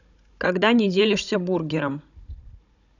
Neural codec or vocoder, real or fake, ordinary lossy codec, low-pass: codec, 16 kHz, 16 kbps, FunCodec, trained on Chinese and English, 50 frames a second; fake; none; 7.2 kHz